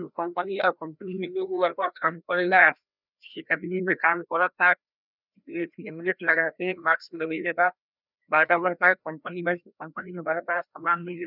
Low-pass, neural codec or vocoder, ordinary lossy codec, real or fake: 5.4 kHz; codec, 16 kHz, 1 kbps, FreqCodec, larger model; none; fake